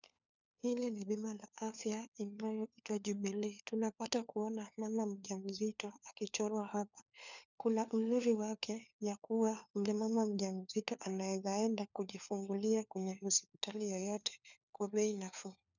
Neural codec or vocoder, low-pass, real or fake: codec, 16 kHz, 4 kbps, FunCodec, trained on LibriTTS, 50 frames a second; 7.2 kHz; fake